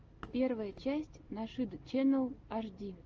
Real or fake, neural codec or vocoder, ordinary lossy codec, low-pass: real; none; Opus, 24 kbps; 7.2 kHz